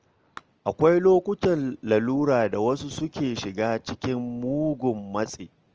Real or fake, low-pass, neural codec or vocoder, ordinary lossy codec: real; 7.2 kHz; none; Opus, 24 kbps